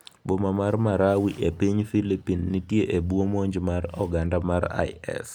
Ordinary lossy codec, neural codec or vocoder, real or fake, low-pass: none; none; real; none